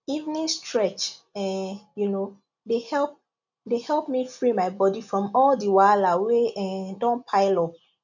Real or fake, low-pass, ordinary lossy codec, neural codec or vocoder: real; 7.2 kHz; none; none